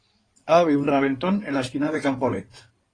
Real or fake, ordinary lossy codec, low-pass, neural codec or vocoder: fake; AAC, 32 kbps; 9.9 kHz; codec, 16 kHz in and 24 kHz out, 2.2 kbps, FireRedTTS-2 codec